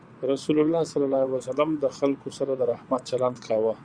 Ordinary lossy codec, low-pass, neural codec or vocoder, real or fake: Opus, 64 kbps; 9.9 kHz; vocoder, 22.05 kHz, 80 mel bands, WaveNeXt; fake